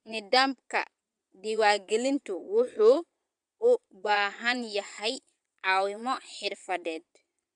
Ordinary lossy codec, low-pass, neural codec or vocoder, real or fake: MP3, 96 kbps; 9.9 kHz; vocoder, 22.05 kHz, 80 mel bands, Vocos; fake